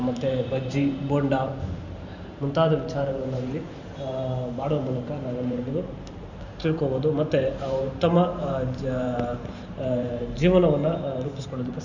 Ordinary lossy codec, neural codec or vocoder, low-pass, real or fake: none; vocoder, 44.1 kHz, 128 mel bands every 512 samples, BigVGAN v2; 7.2 kHz; fake